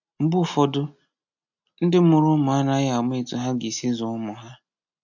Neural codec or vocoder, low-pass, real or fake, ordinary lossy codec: none; 7.2 kHz; real; none